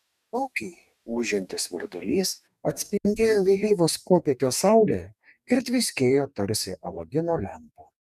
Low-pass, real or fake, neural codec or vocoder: 14.4 kHz; fake; codec, 44.1 kHz, 2.6 kbps, DAC